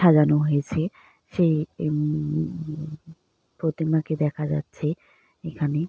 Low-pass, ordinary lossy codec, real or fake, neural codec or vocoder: none; none; real; none